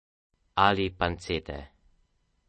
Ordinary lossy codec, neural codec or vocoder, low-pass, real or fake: MP3, 32 kbps; none; 10.8 kHz; real